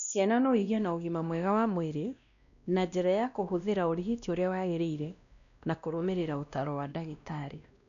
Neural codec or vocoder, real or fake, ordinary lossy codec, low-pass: codec, 16 kHz, 1 kbps, X-Codec, WavLM features, trained on Multilingual LibriSpeech; fake; none; 7.2 kHz